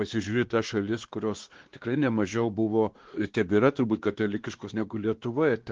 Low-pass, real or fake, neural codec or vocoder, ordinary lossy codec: 7.2 kHz; fake; codec, 16 kHz, 2 kbps, X-Codec, WavLM features, trained on Multilingual LibriSpeech; Opus, 16 kbps